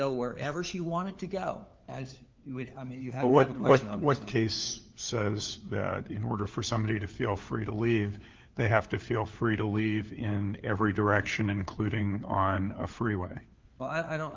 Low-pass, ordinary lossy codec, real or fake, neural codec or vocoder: 7.2 kHz; Opus, 32 kbps; fake; codec, 16 kHz, 4 kbps, FunCodec, trained on LibriTTS, 50 frames a second